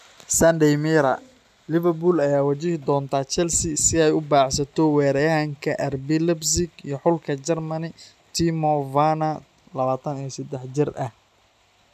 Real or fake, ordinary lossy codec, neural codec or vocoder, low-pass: real; none; none; 14.4 kHz